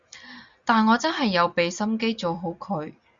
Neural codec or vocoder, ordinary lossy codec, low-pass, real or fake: none; Opus, 64 kbps; 7.2 kHz; real